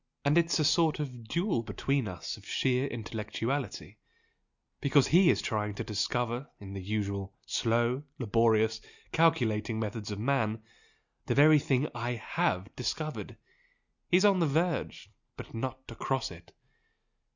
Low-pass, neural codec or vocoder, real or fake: 7.2 kHz; none; real